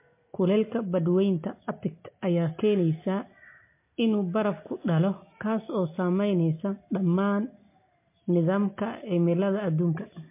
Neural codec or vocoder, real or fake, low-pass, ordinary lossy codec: none; real; 3.6 kHz; MP3, 24 kbps